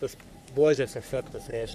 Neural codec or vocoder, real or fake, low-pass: codec, 44.1 kHz, 3.4 kbps, Pupu-Codec; fake; 14.4 kHz